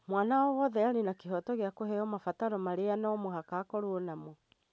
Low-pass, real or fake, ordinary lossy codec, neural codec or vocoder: none; real; none; none